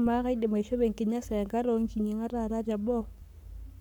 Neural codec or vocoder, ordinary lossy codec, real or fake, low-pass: codec, 44.1 kHz, 7.8 kbps, Pupu-Codec; none; fake; 19.8 kHz